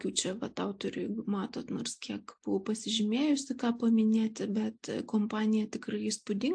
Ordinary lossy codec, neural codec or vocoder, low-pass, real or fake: AAC, 64 kbps; none; 9.9 kHz; real